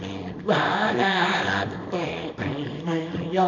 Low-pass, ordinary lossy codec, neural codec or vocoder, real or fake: 7.2 kHz; none; codec, 24 kHz, 0.9 kbps, WavTokenizer, small release; fake